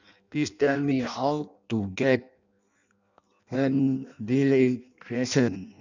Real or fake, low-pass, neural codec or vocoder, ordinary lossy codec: fake; 7.2 kHz; codec, 16 kHz in and 24 kHz out, 0.6 kbps, FireRedTTS-2 codec; none